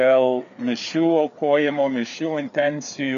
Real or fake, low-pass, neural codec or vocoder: fake; 7.2 kHz; codec, 16 kHz, 4 kbps, FreqCodec, larger model